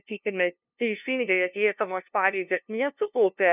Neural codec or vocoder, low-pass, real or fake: codec, 16 kHz, 0.5 kbps, FunCodec, trained on LibriTTS, 25 frames a second; 3.6 kHz; fake